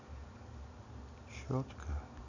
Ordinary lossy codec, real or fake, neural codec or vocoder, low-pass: none; real; none; 7.2 kHz